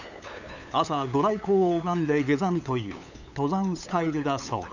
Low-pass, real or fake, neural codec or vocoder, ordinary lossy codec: 7.2 kHz; fake; codec, 16 kHz, 8 kbps, FunCodec, trained on LibriTTS, 25 frames a second; none